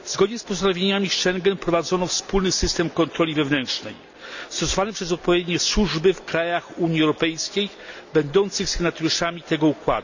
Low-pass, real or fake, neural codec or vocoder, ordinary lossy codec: 7.2 kHz; real; none; none